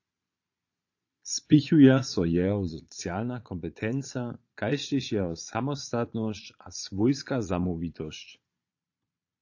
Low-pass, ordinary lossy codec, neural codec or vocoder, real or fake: 7.2 kHz; AAC, 48 kbps; vocoder, 22.05 kHz, 80 mel bands, Vocos; fake